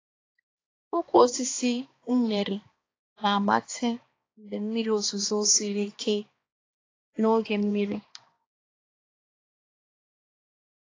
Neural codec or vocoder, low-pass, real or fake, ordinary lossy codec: codec, 16 kHz, 2 kbps, X-Codec, HuBERT features, trained on balanced general audio; 7.2 kHz; fake; AAC, 32 kbps